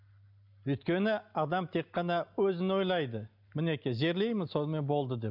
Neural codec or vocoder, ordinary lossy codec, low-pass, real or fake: none; none; 5.4 kHz; real